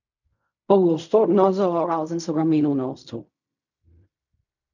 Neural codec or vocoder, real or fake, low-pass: codec, 16 kHz in and 24 kHz out, 0.4 kbps, LongCat-Audio-Codec, fine tuned four codebook decoder; fake; 7.2 kHz